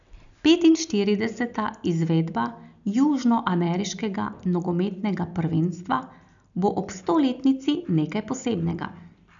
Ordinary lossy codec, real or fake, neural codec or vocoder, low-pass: none; real; none; 7.2 kHz